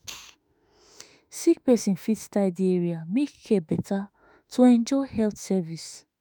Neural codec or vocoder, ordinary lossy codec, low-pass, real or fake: autoencoder, 48 kHz, 32 numbers a frame, DAC-VAE, trained on Japanese speech; none; none; fake